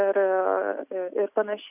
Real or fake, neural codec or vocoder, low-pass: real; none; 3.6 kHz